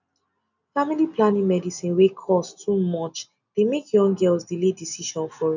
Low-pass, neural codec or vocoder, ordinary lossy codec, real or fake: 7.2 kHz; none; none; real